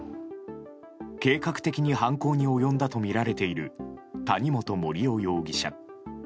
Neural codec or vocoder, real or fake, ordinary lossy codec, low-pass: none; real; none; none